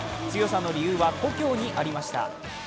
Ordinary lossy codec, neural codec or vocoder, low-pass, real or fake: none; none; none; real